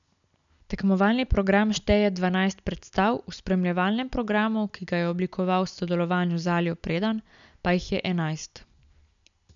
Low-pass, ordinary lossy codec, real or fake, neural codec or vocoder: 7.2 kHz; none; real; none